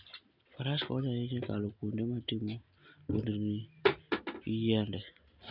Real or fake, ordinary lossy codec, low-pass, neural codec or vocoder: real; none; 5.4 kHz; none